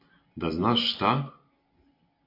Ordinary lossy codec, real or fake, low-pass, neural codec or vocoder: AAC, 32 kbps; real; 5.4 kHz; none